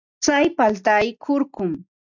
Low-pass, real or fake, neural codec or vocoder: 7.2 kHz; real; none